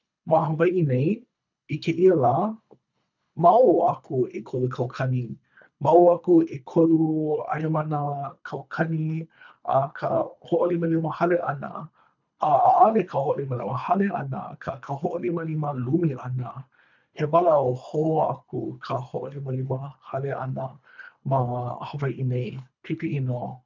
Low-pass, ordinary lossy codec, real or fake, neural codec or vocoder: 7.2 kHz; none; fake; codec, 24 kHz, 3 kbps, HILCodec